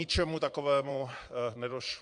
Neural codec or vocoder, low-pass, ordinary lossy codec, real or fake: vocoder, 22.05 kHz, 80 mel bands, Vocos; 9.9 kHz; AAC, 64 kbps; fake